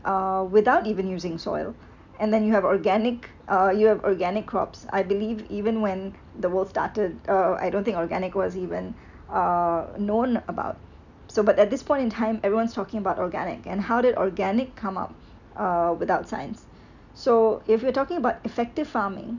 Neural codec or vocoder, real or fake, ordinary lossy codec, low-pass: none; real; none; 7.2 kHz